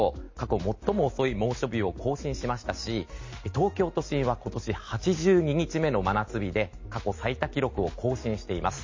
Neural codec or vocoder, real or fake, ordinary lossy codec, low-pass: none; real; MP3, 32 kbps; 7.2 kHz